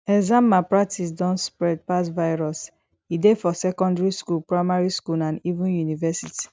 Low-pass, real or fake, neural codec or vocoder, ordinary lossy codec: none; real; none; none